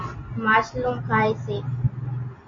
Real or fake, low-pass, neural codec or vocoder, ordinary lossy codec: real; 7.2 kHz; none; MP3, 32 kbps